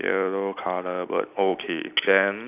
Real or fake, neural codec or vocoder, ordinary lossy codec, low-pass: real; none; none; 3.6 kHz